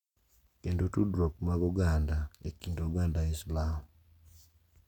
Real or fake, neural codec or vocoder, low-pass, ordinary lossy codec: real; none; 19.8 kHz; none